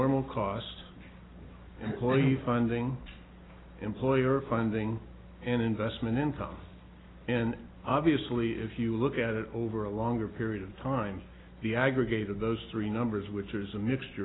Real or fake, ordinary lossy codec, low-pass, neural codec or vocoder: real; AAC, 16 kbps; 7.2 kHz; none